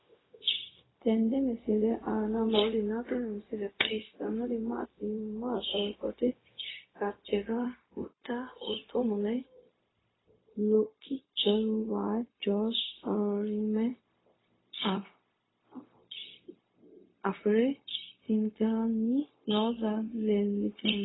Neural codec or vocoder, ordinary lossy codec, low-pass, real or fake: codec, 16 kHz, 0.4 kbps, LongCat-Audio-Codec; AAC, 16 kbps; 7.2 kHz; fake